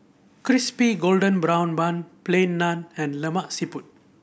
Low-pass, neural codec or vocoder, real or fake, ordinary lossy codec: none; none; real; none